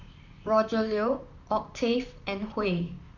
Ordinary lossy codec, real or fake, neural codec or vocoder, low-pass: none; fake; vocoder, 44.1 kHz, 128 mel bands, Pupu-Vocoder; 7.2 kHz